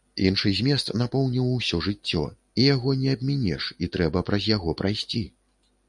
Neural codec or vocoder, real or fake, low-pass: none; real; 10.8 kHz